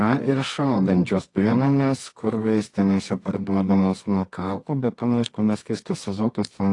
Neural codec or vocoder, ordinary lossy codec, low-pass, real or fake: codec, 24 kHz, 0.9 kbps, WavTokenizer, medium music audio release; AAC, 48 kbps; 10.8 kHz; fake